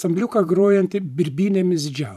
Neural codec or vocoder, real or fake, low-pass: vocoder, 44.1 kHz, 128 mel bands every 512 samples, BigVGAN v2; fake; 14.4 kHz